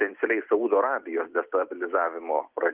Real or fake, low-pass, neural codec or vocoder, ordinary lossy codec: real; 3.6 kHz; none; Opus, 32 kbps